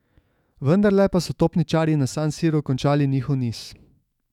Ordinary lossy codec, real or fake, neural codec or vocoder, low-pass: none; fake; autoencoder, 48 kHz, 128 numbers a frame, DAC-VAE, trained on Japanese speech; 19.8 kHz